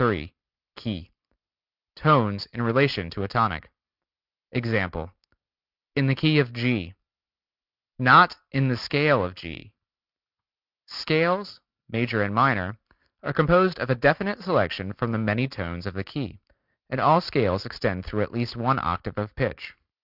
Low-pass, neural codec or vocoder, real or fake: 5.4 kHz; none; real